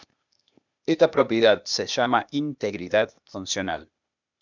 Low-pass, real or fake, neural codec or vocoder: 7.2 kHz; fake; codec, 16 kHz, 0.8 kbps, ZipCodec